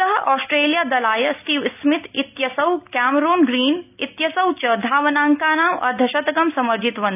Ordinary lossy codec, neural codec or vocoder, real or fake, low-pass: none; none; real; 3.6 kHz